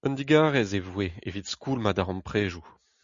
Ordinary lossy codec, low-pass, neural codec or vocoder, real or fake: Opus, 64 kbps; 7.2 kHz; none; real